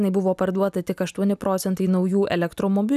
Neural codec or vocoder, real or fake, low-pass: none; real; 14.4 kHz